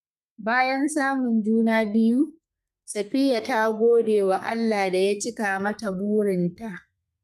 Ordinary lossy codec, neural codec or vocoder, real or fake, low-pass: none; codec, 32 kHz, 1.9 kbps, SNAC; fake; 14.4 kHz